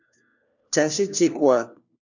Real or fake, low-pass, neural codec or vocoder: fake; 7.2 kHz; codec, 16 kHz, 1 kbps, FunCodec, trained on LibriTTS, 50 frames a second